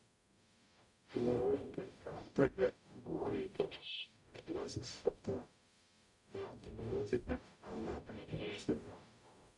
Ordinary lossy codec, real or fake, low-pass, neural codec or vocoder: none; fake; 10.8 kHz; codec, 44.1 kHz, 0.9 kbps, DAC